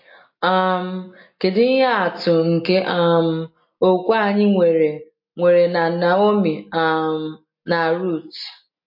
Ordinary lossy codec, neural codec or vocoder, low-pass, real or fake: MP3, 32 kbps; none; 5.4 kHz; real